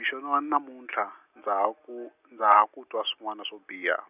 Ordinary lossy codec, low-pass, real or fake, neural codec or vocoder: none; 3.6 kHz; real; none